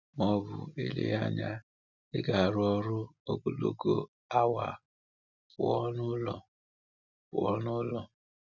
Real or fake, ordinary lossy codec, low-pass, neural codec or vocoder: real; none; 7.2 kHz; none